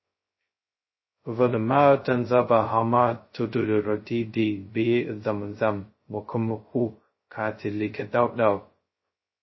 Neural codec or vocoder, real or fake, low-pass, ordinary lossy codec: codec, 16 kHz, 0.2 kbps, FocalCodec; fake; 7.2 kHz; MP3, 24 kbps